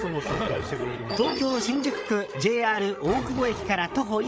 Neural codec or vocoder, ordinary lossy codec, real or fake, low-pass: codec, 16 kHz, 8 kbps, FreqCodec, larger model; none; fake; none